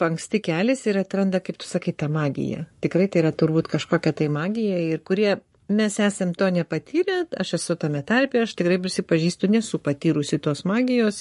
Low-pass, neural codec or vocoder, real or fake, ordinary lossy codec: 14.4 kHz; codec, 44.1 kHz, 7.8 kbps, Pupu-Codec; fake; MP3, 48 kbps